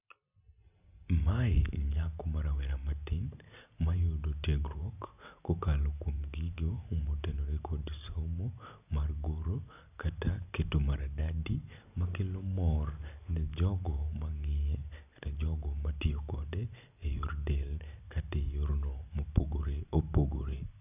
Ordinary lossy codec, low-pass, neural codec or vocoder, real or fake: none; 3.6 kHz; none; real